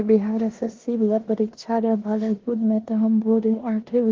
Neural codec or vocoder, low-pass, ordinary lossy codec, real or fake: codec, 16 kHz in and 24 kHz out, 0.9 kbps, LongCat-Audio-Codec, four codebook decoder; 7.2 kHz; Opus, 16 kbps; fake